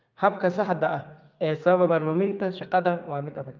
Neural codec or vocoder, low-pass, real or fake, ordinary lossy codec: codec, 32 kHz, 1.9 kbps, SNAC; 7.2 kHz; fake; Opus, 24 kbps